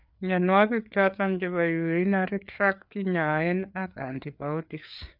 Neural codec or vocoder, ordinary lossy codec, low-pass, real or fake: codec, 16 kHz, 4 kbps, FreqCodec, larger model; none; 5.4 kHz; fake